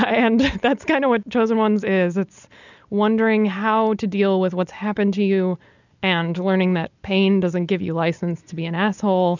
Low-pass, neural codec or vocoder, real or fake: 7.2 kHz; none; real